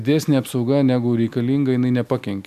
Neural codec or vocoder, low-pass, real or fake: none; 14.4 kHz; real